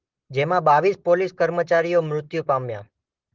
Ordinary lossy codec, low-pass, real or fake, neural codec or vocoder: Opus, 16 kbps; 7.2 kHz; real; none